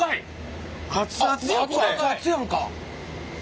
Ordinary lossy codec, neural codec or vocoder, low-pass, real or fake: none; none; none; real